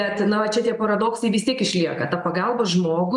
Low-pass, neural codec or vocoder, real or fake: 10.8 kHz; none; real